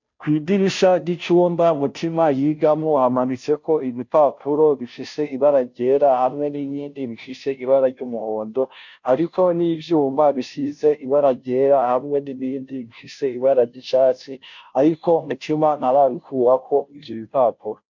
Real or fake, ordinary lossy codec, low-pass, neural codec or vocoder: fake; MP3, 48 kbps; 7.2 kHz; codec, 16 kHz, 0.5 kbps, FunCodec, trained on Chinese and English, 25 frames a second